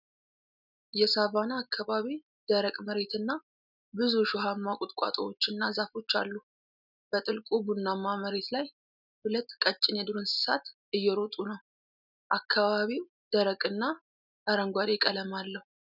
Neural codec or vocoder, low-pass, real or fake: none; 5.4 kHz; real